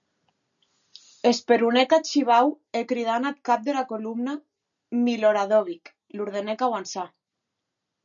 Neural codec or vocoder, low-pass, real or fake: none; 7.2 kHz; real